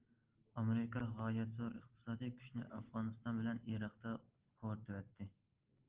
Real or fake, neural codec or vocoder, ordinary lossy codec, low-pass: real; none; Opus, 16 kbps; 3.6 kHz